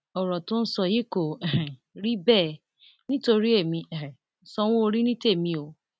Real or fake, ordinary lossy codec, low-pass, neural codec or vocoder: real; none; none; none